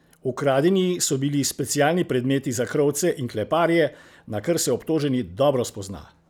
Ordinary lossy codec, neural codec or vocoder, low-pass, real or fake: none; none; none; real